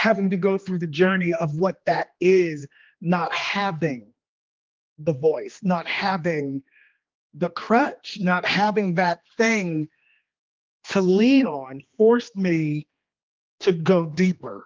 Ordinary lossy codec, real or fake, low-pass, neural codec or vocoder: Opus, 32 kbps; fake; 7.2 kHz; codec, 16 kHz, 2 kbps, X-Codec, HuBERT features, trained on general audio